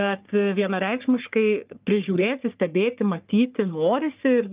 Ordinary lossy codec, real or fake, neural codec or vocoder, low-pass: Opus, 24 kbps; fake; codec, 44.1 kHz, 3.4 kbps, Pupu-Codec; 3.6 kHz